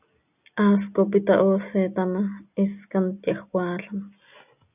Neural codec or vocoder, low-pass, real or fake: none; 3.6 kHz; real